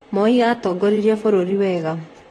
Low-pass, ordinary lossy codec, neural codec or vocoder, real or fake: 19.8 kHz; AAC, 32 kbps; vocoder, 44.1 kHz, 128 mel bands, Pupu-Vocoder; fake